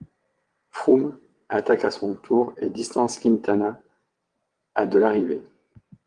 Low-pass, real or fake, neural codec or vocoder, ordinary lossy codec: 9.9 kHz; fake; vocoder, 22.05 kHz, 80 mel bands, WaveNeXt; Opus, 24 kbps